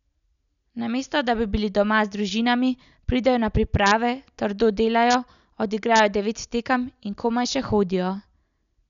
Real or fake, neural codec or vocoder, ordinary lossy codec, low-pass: real; none; none; 7.2 kHz